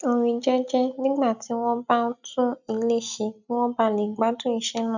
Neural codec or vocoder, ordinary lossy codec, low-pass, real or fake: none; none; 7.2 kHz; real